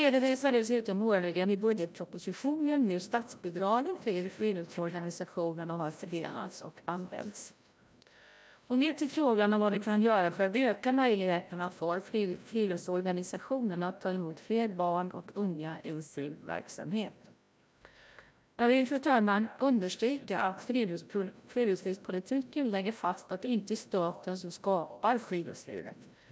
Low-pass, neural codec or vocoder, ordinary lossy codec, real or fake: none; codec, 16 kHz, 0.5 kbps, FreqCodec, larger model; none; fake